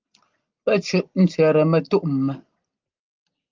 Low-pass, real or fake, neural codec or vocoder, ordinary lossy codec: 7.2 kHz; real; none; Opus, 32 kbps